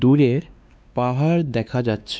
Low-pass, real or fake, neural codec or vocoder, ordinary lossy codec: none; fake; codec, 16 kHz, 2 kbps, X-Codec, WavLM features, trained on Multilingual LibriSpeech; none